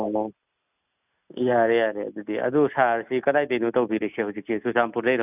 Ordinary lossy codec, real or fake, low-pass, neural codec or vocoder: none; real; 3.6 kHz; none